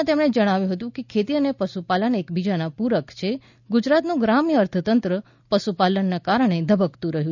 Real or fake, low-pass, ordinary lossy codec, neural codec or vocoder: real; 7.2 kHz; none; none